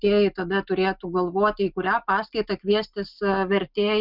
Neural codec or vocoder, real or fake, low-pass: none; real; 5.4 kHz